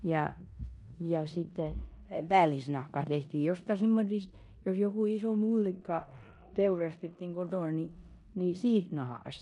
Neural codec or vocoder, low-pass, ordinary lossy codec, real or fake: codec, 16 kHz in and 24 kHz out, 0.9 kbps, LongCat-Audio-Codec, four codebook decoder; 10.8 kHz; MP3, 96 kbps; fake